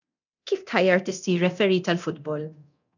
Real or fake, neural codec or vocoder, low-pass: fake; codec, 24 kHz, 0.9 kbps, DualCodec; 7.2 kHz